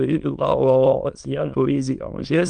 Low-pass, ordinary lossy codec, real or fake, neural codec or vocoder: 9.9 kHz; Opus, 32 kbps; fake; autoencoder, 22.05 kHz, a latent of 192 numbers a frame, VITS, trained on many speakers